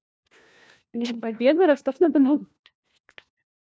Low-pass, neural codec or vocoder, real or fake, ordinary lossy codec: none; codec, 16 kHz, 1 kbps, FunCodec, trained on LibriTTS, 50 frames a second; fake; none